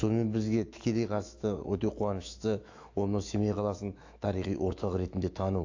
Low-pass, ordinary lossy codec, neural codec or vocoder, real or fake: 7.2 kHz; AAC, 48 kbps; autoencoder, 48 kHz, 128 numbers a frame, DAC-VAE, trained on Japanese speech; fake